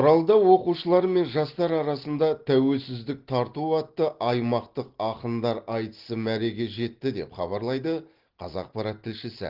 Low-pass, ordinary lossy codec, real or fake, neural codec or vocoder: 5.4 kHz; Opus, 16 kbps; real; none